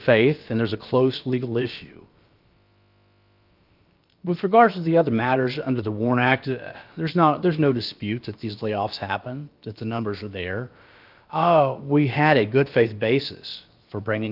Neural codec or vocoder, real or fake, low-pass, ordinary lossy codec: codec, 16 kHz, about 1 kbps, DyCAST, with the encoder's durations; fake; 5.4 kHz; Opus, 24 kbps